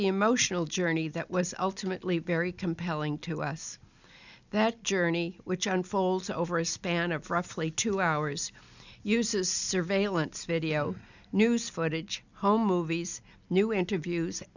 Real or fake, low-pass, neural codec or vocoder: real; 7.2 kHz; none